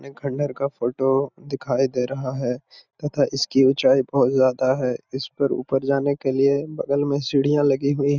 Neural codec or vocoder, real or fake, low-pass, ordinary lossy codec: none; real; 7.2 kHz; none